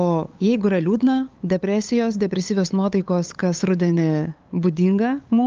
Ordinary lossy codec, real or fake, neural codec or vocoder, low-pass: Opus, 24 kbps; fake; codec, 16 kHz, 8 kbps, FunCodec, trained on LibriTTS, 25 frames a second; 7.2 kHz